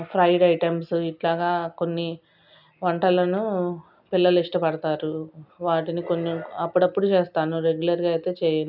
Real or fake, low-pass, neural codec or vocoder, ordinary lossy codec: real; 5.4 kHz; none; none